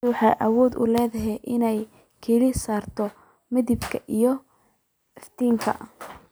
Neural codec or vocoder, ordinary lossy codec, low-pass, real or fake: none; none; none; real